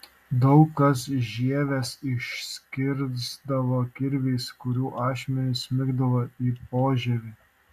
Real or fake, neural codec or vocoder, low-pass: real; none; 14.4 kHz